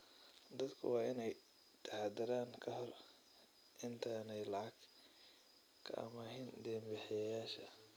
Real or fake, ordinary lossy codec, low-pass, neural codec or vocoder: real; none; none; none